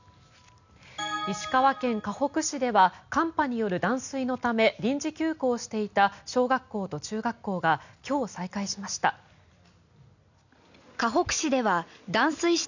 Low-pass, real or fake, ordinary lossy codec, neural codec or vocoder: 7.2 kHz; real; none; none